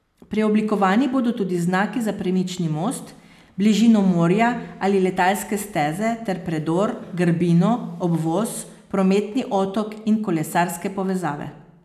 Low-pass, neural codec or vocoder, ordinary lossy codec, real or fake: 14.4 kHz; none; none; real